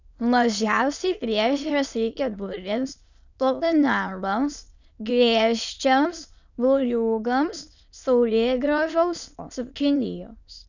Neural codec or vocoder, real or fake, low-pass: autoencoder, 22.05 kHz, a latent of 192 numbers a frame, VITS, trained on many speakers; fake; 7.2 kHz